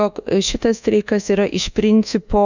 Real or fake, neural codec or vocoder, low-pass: fake; codec, 24 kHz, 1.2 kbps, DualCodec; 7.2 kHz